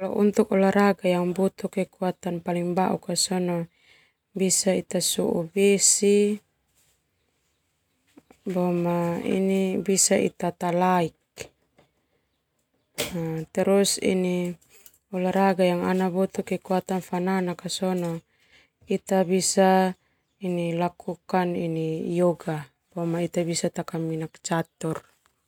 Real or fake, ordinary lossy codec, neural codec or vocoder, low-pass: real; none; none; 19.8 kHz